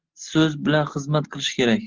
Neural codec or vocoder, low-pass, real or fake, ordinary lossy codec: none; 7.2 kHz; real; Opus, 16 kbps